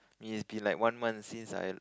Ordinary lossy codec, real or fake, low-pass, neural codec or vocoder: none; real; none; none